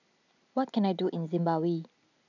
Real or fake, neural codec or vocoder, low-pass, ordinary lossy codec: real; none; 7.2 kHz; none